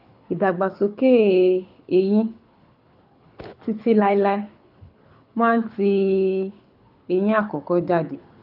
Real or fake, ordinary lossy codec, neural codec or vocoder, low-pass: fake; none; codec, 24 kHz, 6 kbps, HILCodec; 5.4 kHz